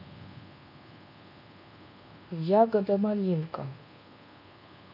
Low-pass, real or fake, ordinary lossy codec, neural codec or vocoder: 5.4 kHz; fake; none; codec, 24 kHz, 1.2 kbps, DualCodec